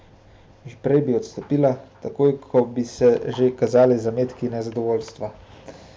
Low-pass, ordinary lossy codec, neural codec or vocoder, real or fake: none; none; none; real